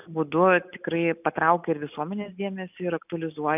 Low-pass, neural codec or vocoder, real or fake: 3.6 kHz; none; real